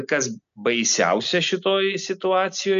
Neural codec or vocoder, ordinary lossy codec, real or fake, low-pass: none; MP3, 64 kbps; real; 7.2 kHz